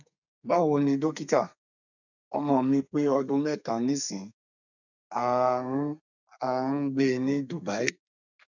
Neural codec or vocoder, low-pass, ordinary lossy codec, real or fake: codec, 32 kHz, 1.9 kbps, SNAC; 7.2 kHz; none; fake